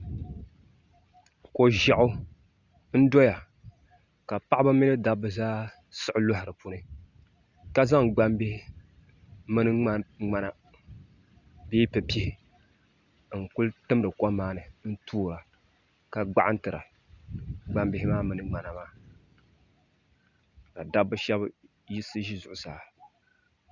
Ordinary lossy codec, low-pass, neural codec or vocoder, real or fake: Opus, 64 kbps; 7.2 kHz; none; real